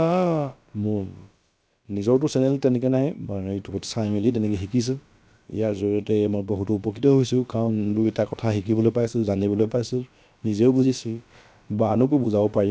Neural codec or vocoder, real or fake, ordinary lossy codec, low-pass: codec, 16 kHz, about 1 kbps, DyCAST, with the encoder's durations; fake; none; none